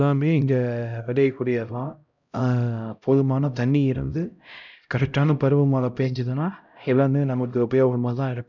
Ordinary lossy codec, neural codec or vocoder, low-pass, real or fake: none; codec, 16 kHz, 0.5 kbps, X-Codec, HuBERT features, trained on LibriSpeech; 7.2 kHz; fake